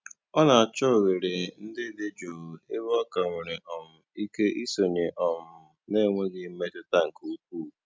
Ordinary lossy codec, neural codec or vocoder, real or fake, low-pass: none; none; real; none